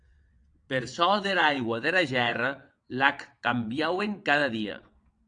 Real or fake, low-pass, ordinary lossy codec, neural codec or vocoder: fake; 9.9 kHz; AAC, 64 kbps; vocoder, 22.05 kHz, 80 mel bands, WaveNeXt